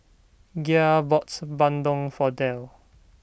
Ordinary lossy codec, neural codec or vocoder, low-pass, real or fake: none; none; none; real